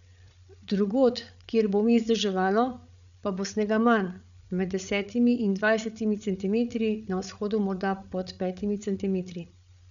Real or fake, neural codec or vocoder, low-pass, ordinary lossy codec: fake; codec, 16 kHz, 8 kbps, FreqCodec, larger model; 7.2 kHz; none